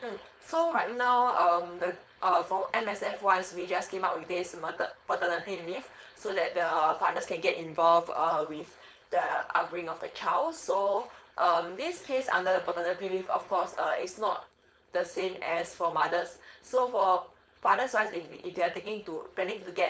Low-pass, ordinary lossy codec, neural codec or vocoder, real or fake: none; none; codec, 16 kHz, 4.8 kbps, FACodec; fake